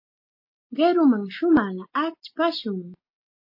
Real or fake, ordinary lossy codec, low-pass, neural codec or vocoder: real; MP3, 32 kbps; 5.4 kHz; none